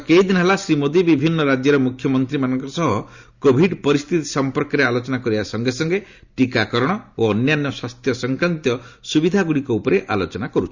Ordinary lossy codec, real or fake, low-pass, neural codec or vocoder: Opus, 64 kbps; real; 7.2 kHz; none